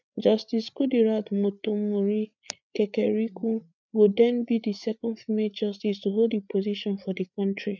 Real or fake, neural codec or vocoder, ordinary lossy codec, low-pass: fake; codec, 16 kHz, 8 kbps, FreqCodec, larger model; none; 7.2 kHz